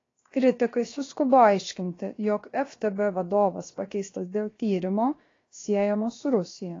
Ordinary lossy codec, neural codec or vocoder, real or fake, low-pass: AAC, 32 kbps; codec, 16 kHz, about 1 kbps, DyCAST, with the encoder's durations; fake; 7.2 kHz